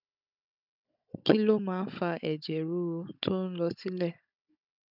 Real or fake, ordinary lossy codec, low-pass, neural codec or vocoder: fake; none; 5.4 kHz; codec, 16 kHz, 16 kbps, FunCodec, trained on Chinese and English, 50 frames a second